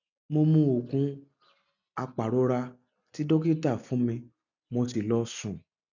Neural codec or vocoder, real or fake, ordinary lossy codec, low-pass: none; real; none; 7.2 kHz